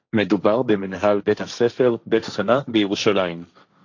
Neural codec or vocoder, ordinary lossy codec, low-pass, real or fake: codec, 16 kHz, 1.1 kbps, Voila-Tokenizer; AAC, 48 kbps; 7.2 kHz; fake